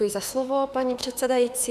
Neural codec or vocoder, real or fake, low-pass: autoencoder, 48 kHz, 32 numbers a frame, DAC-VAE, trained on Japanese speech; fake; 14.4 kHz